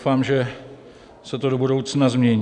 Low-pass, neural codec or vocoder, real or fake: 10.8 kHz; none; real